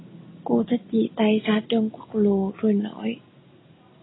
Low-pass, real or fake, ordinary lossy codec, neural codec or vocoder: 7.2 kHz; real; AAC, 16 kbps; none